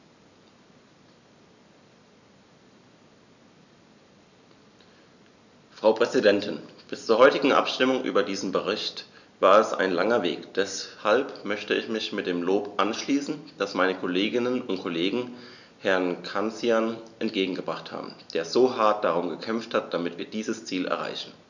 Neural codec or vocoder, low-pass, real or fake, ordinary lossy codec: none; 7.2 kHz; real; none